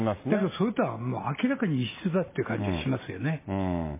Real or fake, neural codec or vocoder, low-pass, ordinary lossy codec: real; none; 3.6 kHz; MP3, 16 kbps